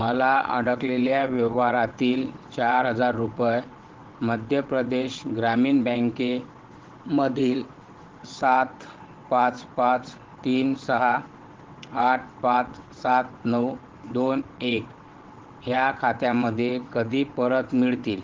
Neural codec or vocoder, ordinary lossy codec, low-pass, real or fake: vocoder, 22.05 kHz, 80 mel bands, WaveNeXt; Opus, 16 kbps; 7.2 kHz; fake